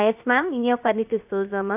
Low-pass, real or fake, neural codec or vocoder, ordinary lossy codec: 3.6 kHz; fake; codec, 16 kHz, 0.7 kbps, FocalCodec; AAC, 32 kbps